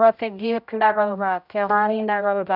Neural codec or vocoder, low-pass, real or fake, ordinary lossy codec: codec, 16 kHz, 0.5 kbps, X-Codec, HuBERT features, trained on general audio; 5.4 kHz; fake; none